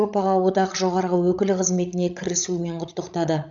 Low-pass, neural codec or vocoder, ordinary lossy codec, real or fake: 7.2 kHz; codec, 16 kHz, 16 kbps, FreqCodec, larger model; none; fake